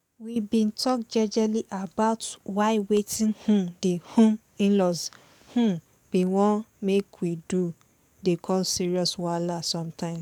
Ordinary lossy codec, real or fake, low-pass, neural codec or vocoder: none; fake; 19.8 kHz; codec, 44.1 kHz, 7.8 kbps, DAC